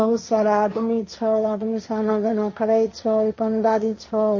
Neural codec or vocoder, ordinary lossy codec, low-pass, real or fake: codec, 16 kHz, 1.1 kbps, Voila-Tokenizer; MP3, 32 kbps; 7.2 kHz; fake